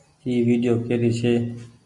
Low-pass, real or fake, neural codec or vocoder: 10.8 kHz; real; none